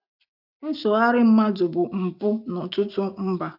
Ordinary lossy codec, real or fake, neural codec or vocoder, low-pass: none; real; none; 5.4 kHz